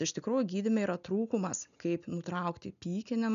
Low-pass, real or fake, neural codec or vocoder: 7.2 kHz; real; none